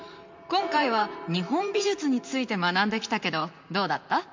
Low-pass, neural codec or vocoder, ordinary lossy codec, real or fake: 7.2 kHz; vocoder, 44.1 kHz, 128 mel bands, Pupu-Vocoder; MP3, 64 kbps; fake